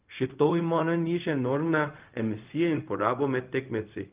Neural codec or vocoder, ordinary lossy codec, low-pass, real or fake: codec, 16 kHz, 0.4 kbps, LongCat-Audio-Codec; Opus, 32 kbps; 3.6 kHz; fake